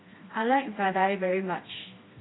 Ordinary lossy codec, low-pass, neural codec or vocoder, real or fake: AAC, 16 kbps; 7.2 kHz; codec, 16 kHz, 2 kbps, FreqCodec, smaller model; fake